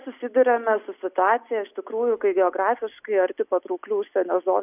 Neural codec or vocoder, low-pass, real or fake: none; 3.6 kHz; real